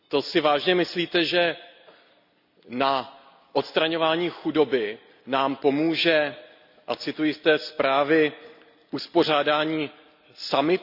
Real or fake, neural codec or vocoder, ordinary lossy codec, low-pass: real; none; none; 5.4 kHz